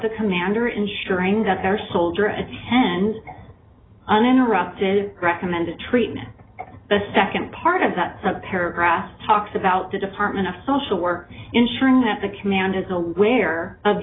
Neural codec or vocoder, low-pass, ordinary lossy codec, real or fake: none; 7.2 kHz; AAC, 16 kbps; real